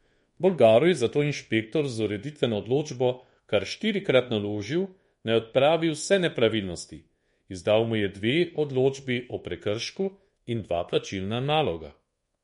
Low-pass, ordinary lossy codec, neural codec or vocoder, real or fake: 10.8 kHz; MP3, 48 kbps; codec, 24 kHz, 1.2 kbps, DualCodec; fake